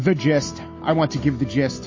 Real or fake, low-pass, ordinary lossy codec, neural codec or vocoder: real; 7.2 kHz; MP3, 32 kbps; none